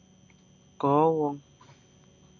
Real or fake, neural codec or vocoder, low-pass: real; none; 7.2 kHz